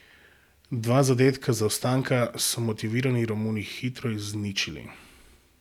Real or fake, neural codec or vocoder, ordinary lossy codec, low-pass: real; none; none; 19.8 kHz